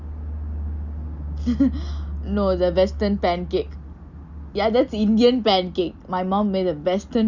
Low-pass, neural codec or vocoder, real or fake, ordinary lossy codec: 7.2 kHz; none; real; Opus, 64 kbps